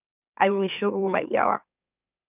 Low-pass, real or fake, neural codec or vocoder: 3.6 kHz; fake; autoencoder, 44.1 kHz, a latent of 192 numbers a frame, MeloTTS